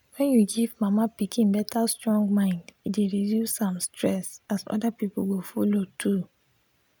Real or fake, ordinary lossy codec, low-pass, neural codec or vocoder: real; none; none; none